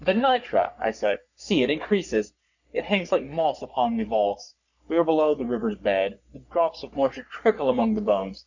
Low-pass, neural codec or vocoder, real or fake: 7.2 kHz; codec, 44.1 kHz, 3.4 kbps, Pupu-Codec; fake